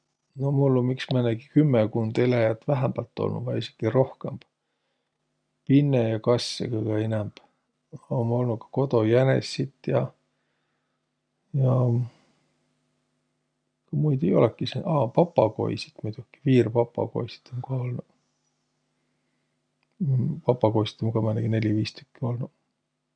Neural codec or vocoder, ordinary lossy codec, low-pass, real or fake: none; none; 9.9 kHz; real